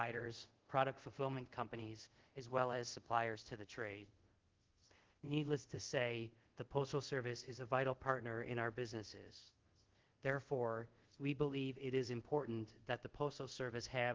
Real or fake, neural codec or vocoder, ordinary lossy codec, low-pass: fake; codec, 24 kHz, 0.5 kbps, DualCodec; Opus, 16 kbps; 7.2 kHz